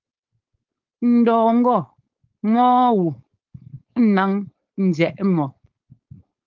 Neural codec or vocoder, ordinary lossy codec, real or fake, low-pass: codec, 16 kHz, 4.8 kbps, FACodec; Opus, 32 kbps; fake; 7.2 kHz